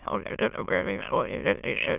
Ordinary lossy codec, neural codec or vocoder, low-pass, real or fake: none; autoencoder, 22.05 kHz, a latent of 192 numbers a frame, VITS, trained on many speakers; 3.6 kHz; fake